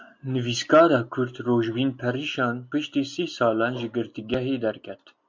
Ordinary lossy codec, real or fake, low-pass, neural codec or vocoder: MP3, 64 kbps; real; 7.2 kHz; none